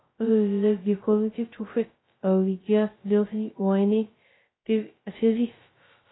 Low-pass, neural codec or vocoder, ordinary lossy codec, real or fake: 7.2 kHz; codec, 16 kHz, 0.2 kbps, FocalCodec; AAC, 16 kbps; fake